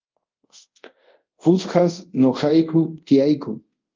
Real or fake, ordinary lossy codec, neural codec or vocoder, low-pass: fake; Opus, 24 kbps; codec, 24 kHz, 0.5 kbps, DualCodec; 7.2 kHz